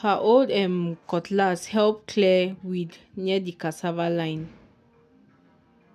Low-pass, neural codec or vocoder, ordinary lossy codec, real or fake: 14.4 kHz; none; none; real